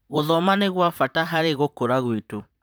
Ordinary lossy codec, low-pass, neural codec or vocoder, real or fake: none; none; vocoder, 44.1 kHz, 128 mel bands, Pupu-Vocoder; fake